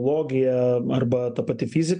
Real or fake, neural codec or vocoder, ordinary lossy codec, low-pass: real; none; MP3, 96 kbps; 9.9 kHz